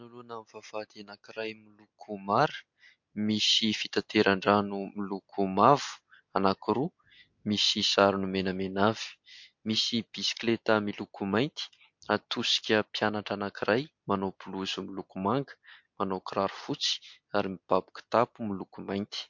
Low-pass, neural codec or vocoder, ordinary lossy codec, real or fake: 7.2 kHz; none; MP3, 48 kbps; real